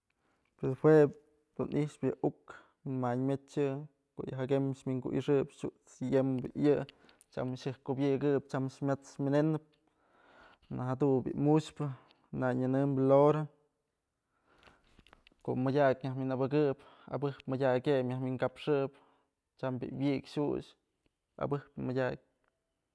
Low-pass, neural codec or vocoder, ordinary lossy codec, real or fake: none; none; none; real